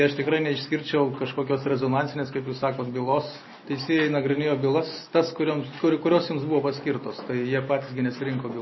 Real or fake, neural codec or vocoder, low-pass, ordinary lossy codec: real; none; 7.2 kHz; MP3, 24 kbps